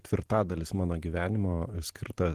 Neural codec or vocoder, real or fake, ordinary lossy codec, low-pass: vocoder, 44.1 kHz, 128 mel bands every 512 samples, BigVGAN v2; fake; Opus, 16 kbps; 14.4 kHz